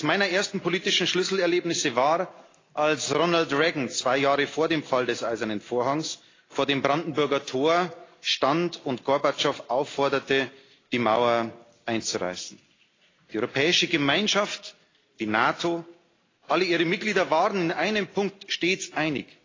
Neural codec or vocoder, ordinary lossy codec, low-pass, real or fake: none; AAC, 32 kbps; 7.2 kHz; real